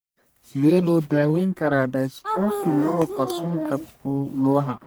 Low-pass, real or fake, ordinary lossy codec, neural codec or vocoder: none; fake; none; codec, 44.1 kHz, 1.7 kbps, Pupu-Codec